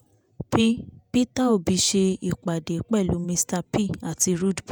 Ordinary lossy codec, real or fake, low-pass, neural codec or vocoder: none; fake; none; vocoder, 48 kHz, 128 mel bands, Vocos